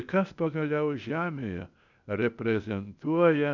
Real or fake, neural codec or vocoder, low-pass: fake; codec, 16 kHz, 0.8 kbps, ZipCodec; 7.2 kHz